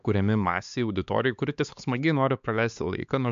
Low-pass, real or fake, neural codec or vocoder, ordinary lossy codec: 7.2 kHz; fake; codec, 16 kHz, 4 kbps, X-Codec, HuBERT features, trained on LibriSpeech; MP3, 64 kbps